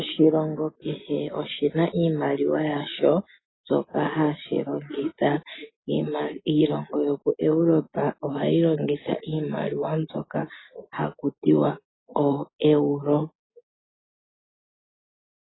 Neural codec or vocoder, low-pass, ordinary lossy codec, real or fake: none; 7.2 kHz; AAC, 16 kbps; real